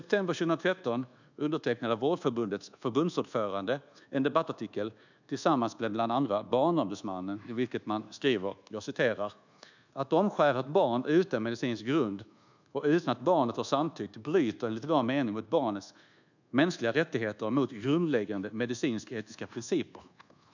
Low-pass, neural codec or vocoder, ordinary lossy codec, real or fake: 7.2 kHz; codec, 24 kHz, 1.2 kbps, DualCodec; none; fake